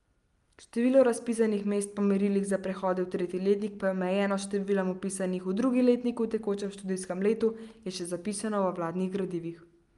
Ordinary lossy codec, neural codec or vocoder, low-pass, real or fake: Opus, 32 kbps; none; 10.8 kHz; real